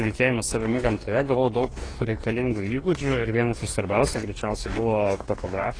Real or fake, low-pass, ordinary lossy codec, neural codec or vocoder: fake; 9.9 kHz; AAC, 32 kbps; codec, 44.1 kHz, 2.6 kbps, DAC